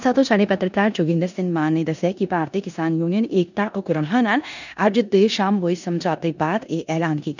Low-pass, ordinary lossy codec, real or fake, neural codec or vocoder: 7.2 kHz; none; fake; codec, 16 kHz in and 24 kHz out, 0.9 kbps, LongCat-Audio-Codec, four codebook decoder